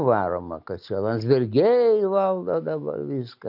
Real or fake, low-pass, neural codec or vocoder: real; 5.4 kHz; none